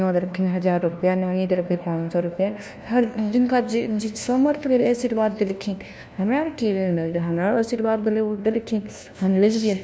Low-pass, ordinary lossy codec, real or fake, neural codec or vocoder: none; none; fake; codec, 16 kHz, 1 kbps, FunCodec, trained on LibriTTS, 50 frames a second